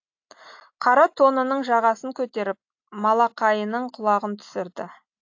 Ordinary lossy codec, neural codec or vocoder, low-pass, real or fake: AAC, 48 kbps; none; 7.2 kHz; real